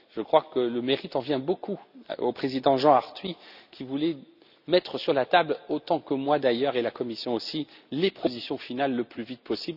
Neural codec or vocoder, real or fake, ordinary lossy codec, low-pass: none; real; none; 5.4 kHz